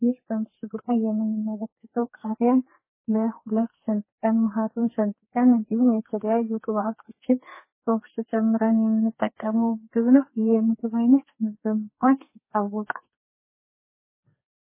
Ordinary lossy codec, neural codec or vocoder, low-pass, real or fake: MP3, 16 kbps; codec, 44.1 kHz, 2.6 kbps, SNAC; 3.6 kHz; fake